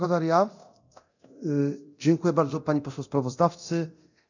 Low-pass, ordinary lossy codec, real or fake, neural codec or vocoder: 7.2 kHz; none; fake; codec, 24 kHz, 0.9 kbps, DualCodec